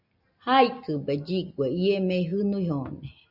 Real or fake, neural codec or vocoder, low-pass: real; none; 5.4 kHz